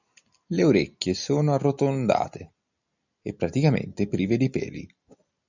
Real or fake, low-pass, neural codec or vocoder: real; 7.2 kHz; none